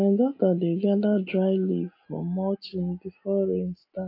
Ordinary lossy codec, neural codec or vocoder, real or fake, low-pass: AAC, 32 kbps; none; real; 5.4 kHz